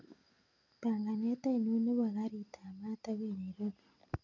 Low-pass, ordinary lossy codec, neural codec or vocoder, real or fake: 7.2 kHz; none; none; real